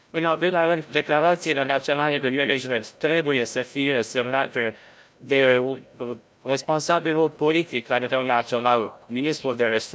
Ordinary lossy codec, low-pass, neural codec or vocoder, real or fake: none; none; codec, 16 kHz, 0.5 kbps, FreqCodec, larger model; fake